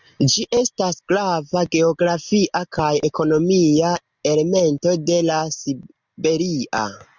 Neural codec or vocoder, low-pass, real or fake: none; 7.2 kHz; real